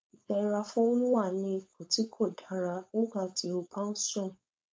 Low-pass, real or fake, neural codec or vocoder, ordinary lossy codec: none; fake; codec, 16 kHz, 4.8 kbps, FACodec; none